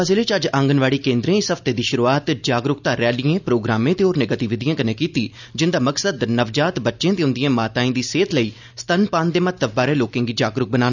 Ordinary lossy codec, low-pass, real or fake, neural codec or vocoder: none; 7.2 kHz; real; none